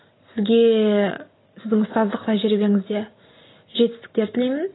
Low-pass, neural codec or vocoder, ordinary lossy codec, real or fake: 7.2 kHz; none; AAC, 16 kbps; real